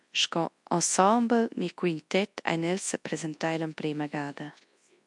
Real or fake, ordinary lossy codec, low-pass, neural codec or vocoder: fake; MP3, 64 kbps; 10.8 kHz; codec, 24 kHz, 0.9 kbps, WavTokenizer, large speech release